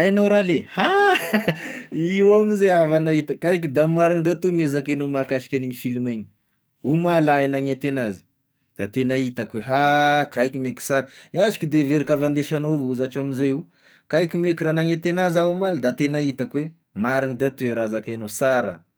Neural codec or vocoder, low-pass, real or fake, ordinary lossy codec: codec, 44.1 kHz, 2.6 kbps, SNAC; none; fake; none